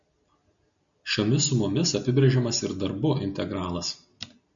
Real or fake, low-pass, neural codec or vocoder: real; 7.2 kHz; none